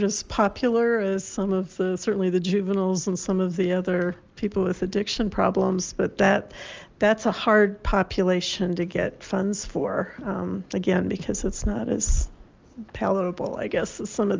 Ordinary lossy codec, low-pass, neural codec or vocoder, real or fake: Opus, 24 kbps; 7.2 kHz; none; real